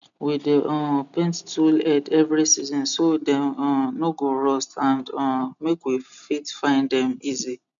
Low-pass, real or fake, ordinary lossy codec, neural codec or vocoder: 7.2 kHz; real; none; none